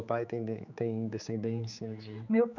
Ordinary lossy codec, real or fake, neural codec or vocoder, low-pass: none; fake; codec, 16 kHz, 4 kbps, X-Codec, HuBERT features, trained on general audio; 7.2 kHz